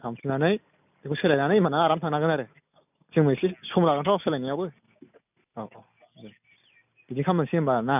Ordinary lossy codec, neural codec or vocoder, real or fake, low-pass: none; none; real; 3.6 kHz